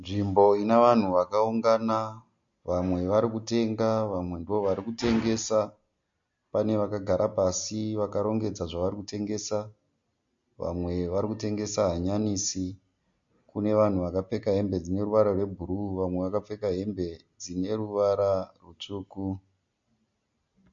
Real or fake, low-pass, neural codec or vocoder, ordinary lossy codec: real; 7.2 kHz; none; MP3, 48 kbps